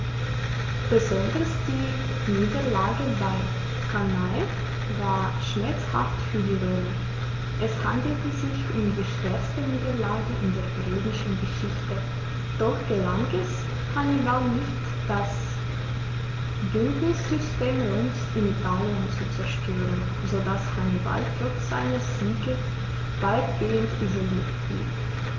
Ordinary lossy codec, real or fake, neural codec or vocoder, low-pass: Opus, 32 kbps; real; none; 7.2 kHz